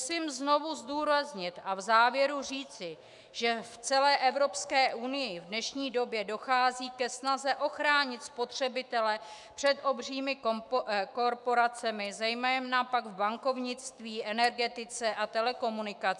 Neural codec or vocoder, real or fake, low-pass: autoencoder, 48 kHz, 128 numbers a frame, DAC-VAE, trained on Japanese speech; fake; 10.8 kHz